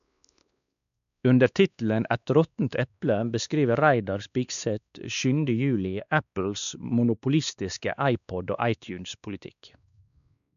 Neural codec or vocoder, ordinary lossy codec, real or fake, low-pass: codec, 16 kHz, 2 kbps, X-Codec, WavLM features, trained on Multilingual LibriSpeech; none; fake; 7.2 kHz